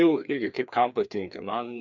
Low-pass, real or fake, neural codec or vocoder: 7.2 kHz; fake; codec, 16 kHz, 2 kbps, FreqCodec, larger model